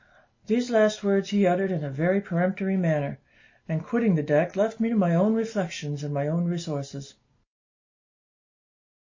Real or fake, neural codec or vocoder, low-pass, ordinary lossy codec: real; none; 7.2 kHz; MP3, 32 kbps